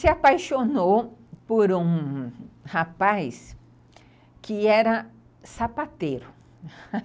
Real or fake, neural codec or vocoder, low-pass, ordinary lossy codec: real; none; none; none